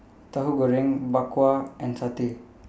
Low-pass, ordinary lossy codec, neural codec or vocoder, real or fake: none; none; none; real